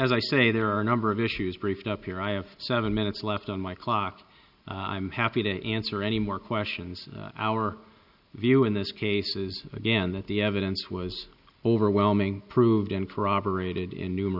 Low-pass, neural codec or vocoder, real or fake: 5.4 kHz; none; real